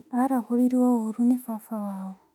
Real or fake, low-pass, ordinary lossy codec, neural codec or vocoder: fake; 19.8 kHz; none; autoencoder, 48 kHz, 32 numbers a frame, DAC-VAE, trained on Japanese speech